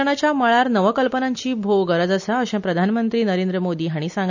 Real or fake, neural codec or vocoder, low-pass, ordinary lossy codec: real; none; 7.2 kHz; none